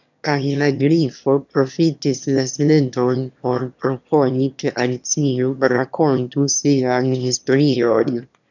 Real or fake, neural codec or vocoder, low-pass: fake; autoencoder, 22.05 kHz, a latent of 192 numbers a frame, VITS, trained on one speaker; 7.2 kHz